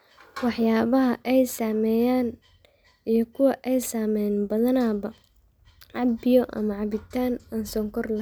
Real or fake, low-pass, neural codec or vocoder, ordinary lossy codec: real; none; none; none